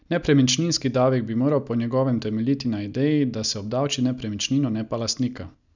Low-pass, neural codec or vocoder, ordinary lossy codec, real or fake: 7.2 kHz; none; none; real